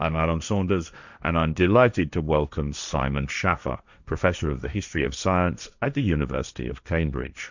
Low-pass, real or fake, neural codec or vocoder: 7.2 kHz; fake; codec, 16 kHz, 1.1 kbps, Voila-Tokenizer